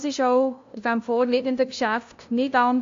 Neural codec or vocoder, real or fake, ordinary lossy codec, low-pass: codec, 16 kHz, 0.5 kbps, FunCodec, trained on LibriTTS, 25 frames a second; fake; AAC, 48 kbps; 7.2 kHz